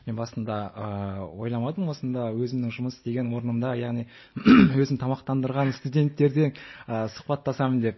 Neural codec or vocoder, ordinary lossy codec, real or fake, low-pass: none; MP3, 24 kbps; real; 7.2 kHz